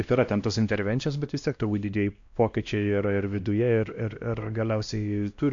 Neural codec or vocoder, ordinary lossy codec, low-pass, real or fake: codec, 16 kHz, 1 kbps, X-Codec, WavLM features, trained on Multilingual LibriSpeech; AAC, 64 kbps; 7.2 kHz; fake